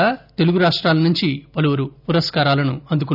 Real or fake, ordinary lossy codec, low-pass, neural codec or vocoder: real; none; 5.4 kHz; none